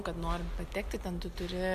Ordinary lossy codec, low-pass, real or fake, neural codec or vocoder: MP3, 96 kbps; 14.4 kHz; real; none